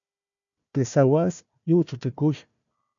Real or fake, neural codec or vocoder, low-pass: fake; codec, 16 kHz, 1 kbps, FunCodec, trained on Chinese and English, 50 frames a second; 7.2 kHz